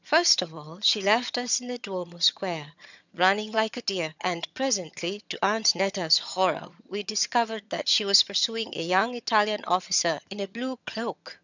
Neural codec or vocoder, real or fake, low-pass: vocoder, 22.05 kHz, 80 mel bands, HiFi-GAN; fake; 7.2 kHz